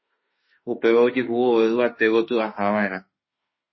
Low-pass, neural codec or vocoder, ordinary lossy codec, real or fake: 7.2 kHz; autoencoder, 48 kHz, 32 numbers a frame, DAC-VAE, trained on Japanese speech; MP3, 24 kbps; fake